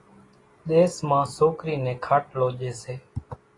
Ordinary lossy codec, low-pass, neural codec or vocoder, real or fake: AAC, 32 kbps; 10.8 kHz; none; real